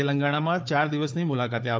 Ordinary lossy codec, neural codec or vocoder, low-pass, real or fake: none; codec, 16 kHz, 4 kbps, FunCodec, trained on Chinese and English, 50 frames a second; none; fake